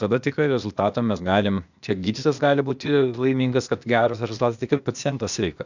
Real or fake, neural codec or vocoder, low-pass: fake; codec, 16 kHz, 0.8 kbps, ZipCodec; 7.2 kHz